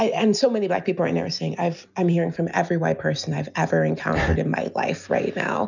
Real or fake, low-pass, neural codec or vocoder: real; 7.2 kHz; none